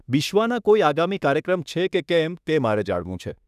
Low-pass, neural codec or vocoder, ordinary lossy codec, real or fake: 14.4 kHz; autoencoder, 48 kHz, 32 numbers a frame, DAC-VAE, trained on Japanese speech; none; fake